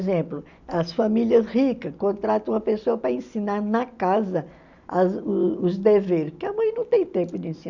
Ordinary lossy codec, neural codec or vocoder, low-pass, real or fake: none; none; 7.2 kHz; real